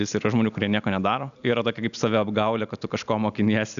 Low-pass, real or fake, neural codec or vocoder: 7.2 kHz; real; none